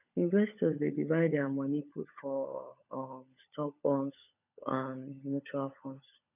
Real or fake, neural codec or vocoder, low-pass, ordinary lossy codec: fake; codec, 16 kHz, 16 kbps, FunCodec, trained on LibriTTS, 50 frames a second; 3.6 kHz; none